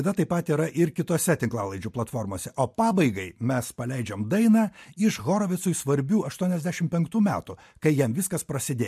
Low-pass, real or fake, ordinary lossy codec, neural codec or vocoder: 14.4 kHz; real; MP3, 64 kbps; none